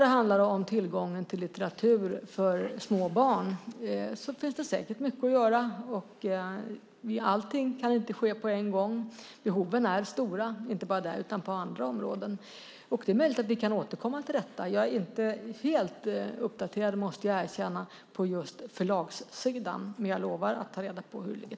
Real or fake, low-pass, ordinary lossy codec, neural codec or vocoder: real; none; none; none